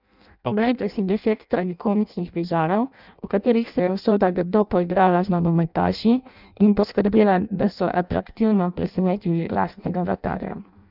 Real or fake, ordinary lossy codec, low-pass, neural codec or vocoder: fake; none; 5.4 kHz; codec, 16 kHz in and 24 kHz out, 0.6 kbps, FireRedTTS-2 codec